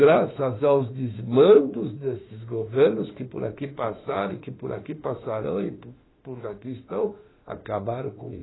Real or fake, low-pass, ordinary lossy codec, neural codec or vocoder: fake; 7.2 kHz; AAC, 16 kbps; autoencoder, 48 kHz, 32 numbers a frame, DAC-VAE, trained on Japanese speech